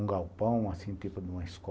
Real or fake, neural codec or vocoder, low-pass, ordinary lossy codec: real; none; none; none